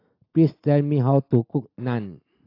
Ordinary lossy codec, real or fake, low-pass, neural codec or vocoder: AAC, 32 kbps; real; 5.4 kHz; none